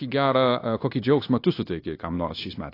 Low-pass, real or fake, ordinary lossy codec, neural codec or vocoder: 5.4 kHz; fake; AAC, 32 kbps; codec, 16 kHz, 0.9 kbps, LongCat-Audio-Codec